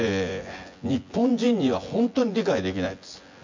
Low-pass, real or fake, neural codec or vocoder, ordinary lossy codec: 7.2 kHz; fake; vocoder, 24 kHz, 100 mel bands, Vocos; MP3, 48 kbps